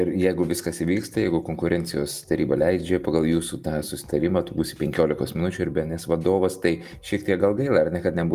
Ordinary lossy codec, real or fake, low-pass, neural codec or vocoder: Opus, 32 kbps; real; 14.4 kHz; none